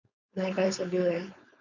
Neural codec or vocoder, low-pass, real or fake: codec, 16 kHz, 4.8 kbps, FACodec; 7.2 kHz; fake